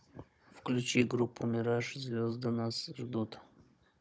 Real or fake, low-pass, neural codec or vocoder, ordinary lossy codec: fake; none; codec, 16 kHz, 16 kbps, FunCodec, trained on Chinese and English, 50 frames a second; none